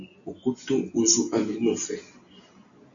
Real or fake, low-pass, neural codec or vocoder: real; 7.2 kHz; none